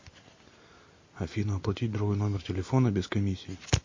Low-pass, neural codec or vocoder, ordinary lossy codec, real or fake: 7.2 kHz; none; MP3, 32 kbps; real